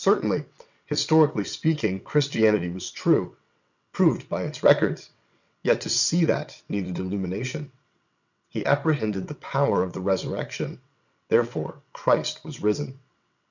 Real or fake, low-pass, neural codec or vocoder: fake; 7.2 kHz; vocoder, 22.05 kHz, 80 mel bands, WaveNeXt